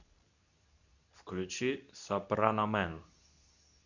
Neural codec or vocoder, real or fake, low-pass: codec, 24 kHz, 0.9 kbps, WavTokenizer, medium speech release version 2; fake; 7.2 kHz